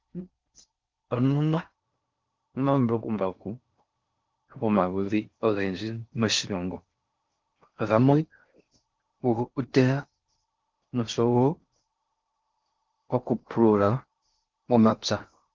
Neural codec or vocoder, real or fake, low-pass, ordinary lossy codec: codec, 16 kHz in and 24 kHz out, 0.6 kbps, FocalCodec, streaming, 4096 codes; fake; 7.2 kHz; Opus, 32 kbps